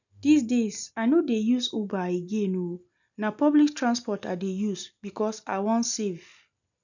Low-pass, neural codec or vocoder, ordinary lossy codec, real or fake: 7.2 kHz; none; none; real